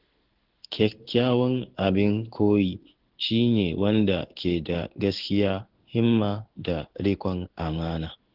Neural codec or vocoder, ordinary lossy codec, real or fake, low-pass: codec, 16 kHz in and 24 kHz out, 1 kbps, XY-Tokenizer; Opus, 16 kbps; fake; 5.4 kHz